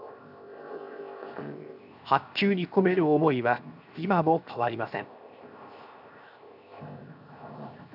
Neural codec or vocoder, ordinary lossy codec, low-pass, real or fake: codec, 16 kHz, 0.7 kbps, FocalCodec; none; 5.4 kHz; fake